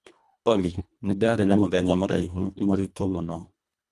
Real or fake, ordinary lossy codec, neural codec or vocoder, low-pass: fake; none; codec, 24 kHz, 1.5 kbps, HILCodec; none